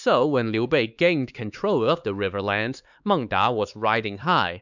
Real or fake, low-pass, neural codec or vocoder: fake; 7.2 kHz; codec, 16 kHz, 4 kbps, X-Codec, HuBERT features, trained on LibriSpeech